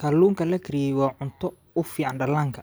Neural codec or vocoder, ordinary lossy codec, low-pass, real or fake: none; none; none; real